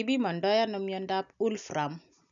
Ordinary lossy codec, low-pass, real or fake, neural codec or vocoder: none; 7.2 kHz; real; none